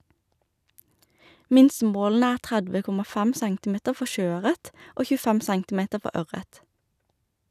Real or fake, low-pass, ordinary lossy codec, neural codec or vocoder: real; 14.4 kHz; none; none